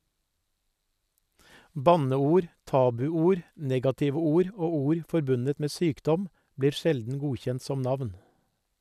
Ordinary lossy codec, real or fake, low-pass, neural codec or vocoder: none; real; 14.4 kHz; none